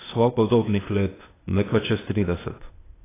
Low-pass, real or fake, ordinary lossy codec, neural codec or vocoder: 3.6 kHz; fake; AAC, 16 kbps; codec, 16 kHz, 0.8 kbps, ZipCodec